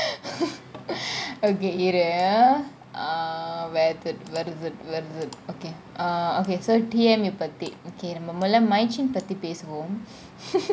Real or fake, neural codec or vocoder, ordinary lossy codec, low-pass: real; none; none; none